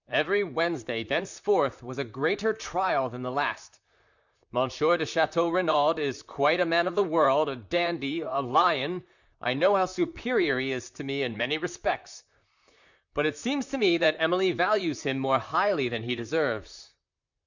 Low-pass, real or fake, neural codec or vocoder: 7.2 kHz; fake; vocoder, 44.1 kHz, 128 mel bands, Pupu-Vocoder